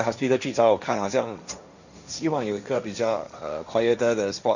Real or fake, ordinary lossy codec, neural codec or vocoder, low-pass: fake; none; codec, 16 kHz, 1.1 kbps, Voila-Tokenizer; 7.2 kHz